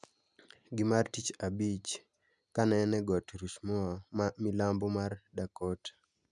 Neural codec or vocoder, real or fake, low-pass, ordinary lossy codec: none; real; 10.8 kHz; none